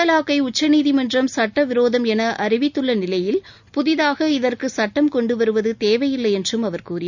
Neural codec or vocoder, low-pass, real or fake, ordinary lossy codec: none; 7.2 kHz; real; none